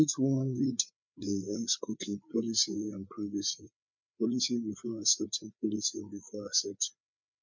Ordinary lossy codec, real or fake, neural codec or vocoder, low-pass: MP3, 64 kbps; fake; codec, 16 kHz, 4 kbps, FreqCodec, larger model; 7.2 kHz